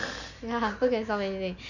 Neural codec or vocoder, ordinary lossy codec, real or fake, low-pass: none; none; real; 7.2 kHz